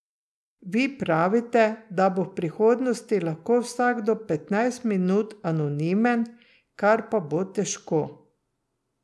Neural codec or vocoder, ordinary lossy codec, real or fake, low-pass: none; none; real; none